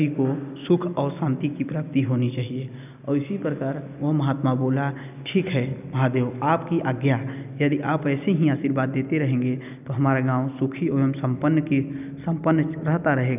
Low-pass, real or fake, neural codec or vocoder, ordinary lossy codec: 3.6 kHz; real; none; none